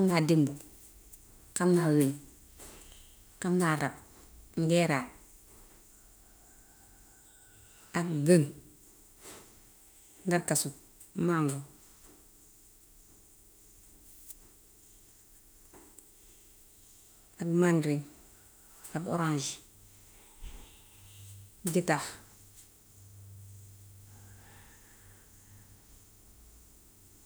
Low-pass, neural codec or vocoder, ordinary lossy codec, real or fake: none; autoencoder, 48 kHz, 32 numbers a frame, DAC-VAE, trained on Japanese speech; none; fake